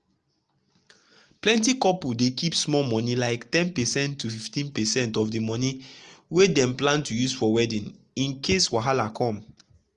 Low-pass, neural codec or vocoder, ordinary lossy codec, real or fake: 10.8 kHz; none; Opus, 24 kbps; real